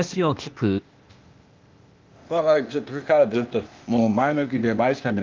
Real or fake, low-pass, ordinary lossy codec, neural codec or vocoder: fake; 7.2 kHz; Opus, 24 kbps; codec, 16 kHz, 0.8 kbps, ZipCodec